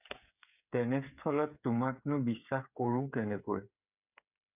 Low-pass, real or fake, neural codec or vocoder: 3.6 kHz; fake; codec, 16 kHz, 8 kbps, FreqCodec, smaller model